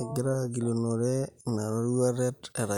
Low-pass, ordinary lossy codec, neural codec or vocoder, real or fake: 19.8 kHz; none; none; real